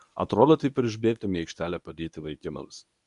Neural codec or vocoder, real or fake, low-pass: codec, 24 kHz, 0.9 kbps, WavTokenizer, medium speech release version 1; fake; 10.8 kHz